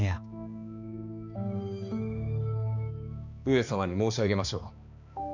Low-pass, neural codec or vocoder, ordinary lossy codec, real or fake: 7.2 kHz; codec, 16 kHz, 2 kbps, X-Codec, HuBERT features, trained on balanced general audio; none; fake